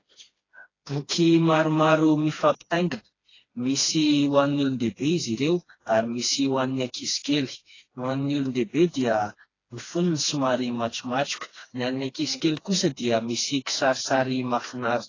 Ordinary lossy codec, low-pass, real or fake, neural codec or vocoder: AAC, 32 kbps; 7.2 kHz; fake; codec, 16 kHz, 2 kbps, FreqCodec, smaller model